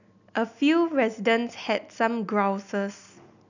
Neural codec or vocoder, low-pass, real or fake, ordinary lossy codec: none; 7.2 kHz; real; none